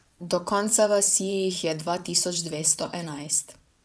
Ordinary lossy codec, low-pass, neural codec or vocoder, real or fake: none; none; vocoder, 22.05 kHz, 80 mel bands, WaveNeXt; fake